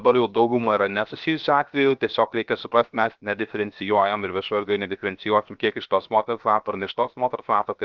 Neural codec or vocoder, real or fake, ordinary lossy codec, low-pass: codec, 16 kHz, 0.7 kbps, FocalCodec; fake; Opus, 32 kbps; 7.2 kHz